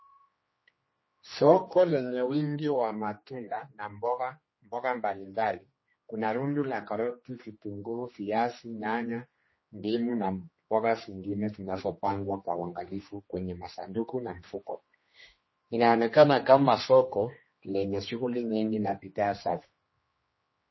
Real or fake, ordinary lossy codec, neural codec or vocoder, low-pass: fake; MP3, 24 kbps; codec, 16 kHz, 2 kbps, X-Codec, HuBERT features, trained on general audio; 7.2 kHz